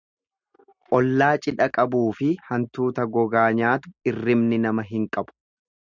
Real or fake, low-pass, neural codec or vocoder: real; 7.2 kHz; none